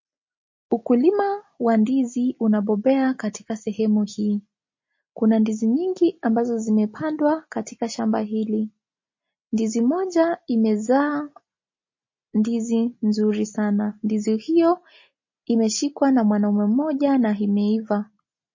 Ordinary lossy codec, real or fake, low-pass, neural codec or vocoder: MP3, 32 kbps; real; 7.2 kHz; none